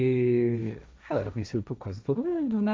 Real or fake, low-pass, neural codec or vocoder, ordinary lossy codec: fake; none; codec, 16 kHz, 1.1 kbps, Voila-Tokenizer; none